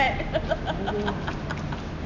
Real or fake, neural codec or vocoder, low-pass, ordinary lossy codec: real; none; 7.2 kHz; none